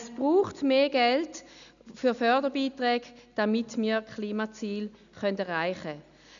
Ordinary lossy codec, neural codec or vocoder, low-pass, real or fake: none; none; 7.2 kHz; real